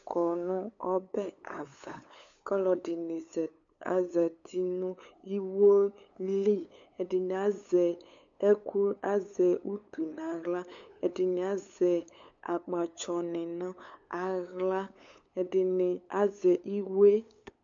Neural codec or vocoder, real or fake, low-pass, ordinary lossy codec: codec, 16 kHz, 8 kbps, FunCodec, trained on LibriTTS, 25 frames a second; fake; 7.2 kHz; MP3, 64 kbps